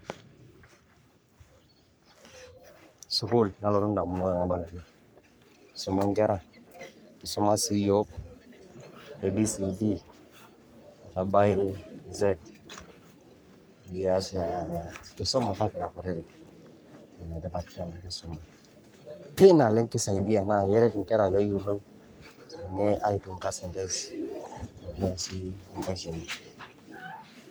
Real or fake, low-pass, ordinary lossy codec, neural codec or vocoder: fake; none; none; codec, 44.1 kHz, 3.4 kbps, Pupu-Codec